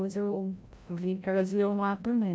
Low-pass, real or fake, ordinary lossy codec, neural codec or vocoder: none; fake; none; codec, 16 kHz, 0.5 kbps, FreqCodec, larger model